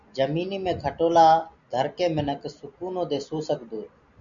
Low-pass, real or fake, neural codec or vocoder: 7.2 kHz; real; none